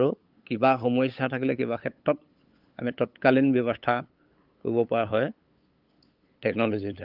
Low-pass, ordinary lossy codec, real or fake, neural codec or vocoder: 5.4 kHz; Opus, 32 kbps; fake; codec, 16 kHz, 8 kbps, FunCodec, trained on LibriTTS, 25 frames a second